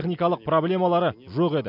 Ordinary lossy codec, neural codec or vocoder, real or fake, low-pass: none; none; real; 5.4 kHz